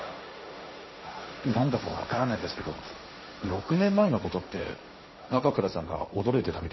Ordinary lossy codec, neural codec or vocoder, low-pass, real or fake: MP3, 24 kbps; codec, 16 kHz, 1.1 kbps, Voila-Tokenizer; 7.2 kHz; fake